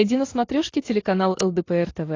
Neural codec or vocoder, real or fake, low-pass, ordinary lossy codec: none; real; 7.2 kHz; AAC, 32 kbps